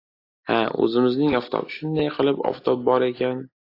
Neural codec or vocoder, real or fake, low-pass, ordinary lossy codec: none; real; 5.4 kHz; AAC, 32 kbps